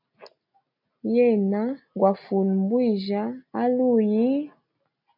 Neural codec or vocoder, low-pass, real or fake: none; 5.4 kHz; real